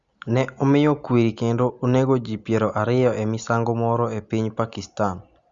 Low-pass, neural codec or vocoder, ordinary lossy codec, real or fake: 7.2 kHz; none; Opus, 64 kbps; real